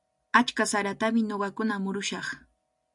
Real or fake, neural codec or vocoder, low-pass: real; none; 10.8 kHz